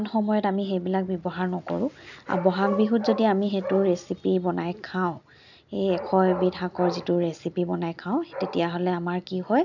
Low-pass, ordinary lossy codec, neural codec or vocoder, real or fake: 7.2 kHz; none; none; real